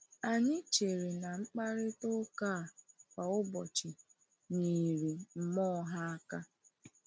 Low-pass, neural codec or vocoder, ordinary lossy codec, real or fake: none; none; none; real